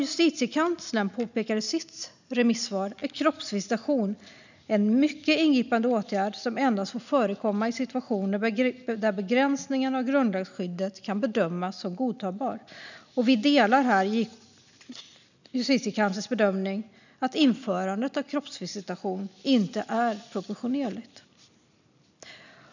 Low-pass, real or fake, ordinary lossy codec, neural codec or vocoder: 7.2 kHz; real; none; none